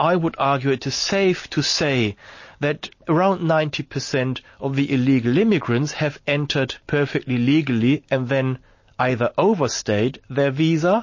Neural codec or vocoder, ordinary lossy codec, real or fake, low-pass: none; MP3, 32 kbps; real; 7.2 kHz